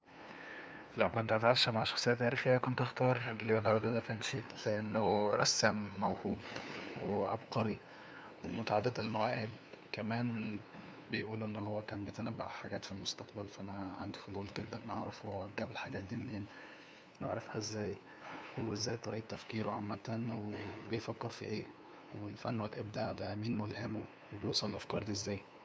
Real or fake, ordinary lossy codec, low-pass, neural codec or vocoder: fake; none; none; codec, 16 kHz, 2 kbps, FunCodec, trained on LibriTTS, 25 frames a second